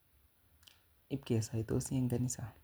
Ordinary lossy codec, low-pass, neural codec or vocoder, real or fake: none; none; none; real